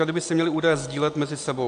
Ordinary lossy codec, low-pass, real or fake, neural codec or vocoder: AAC, 64 kbps; 9.9 kHz; real; none